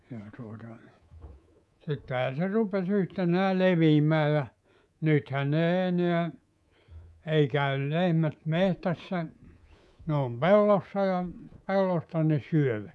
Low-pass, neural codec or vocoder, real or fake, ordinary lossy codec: none; codec, 24 kHz, 3.1 kbps, DualCodec; fake; none